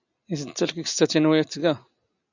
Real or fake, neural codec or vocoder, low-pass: real; none; 7.2 kHz